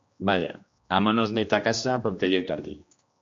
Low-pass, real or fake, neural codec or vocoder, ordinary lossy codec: 7.2 kHz; fake; codec, 16 kHz, 1 kbps, X-Codec, HuBERT features, trained on general audio; MP3, 48 kbps